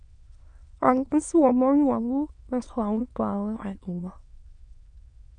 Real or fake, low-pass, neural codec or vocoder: fake; 9.9 kHz; autoencoder, 22.05 kHz, a latent of 192 numbers a frame, VITS, trained on many speakers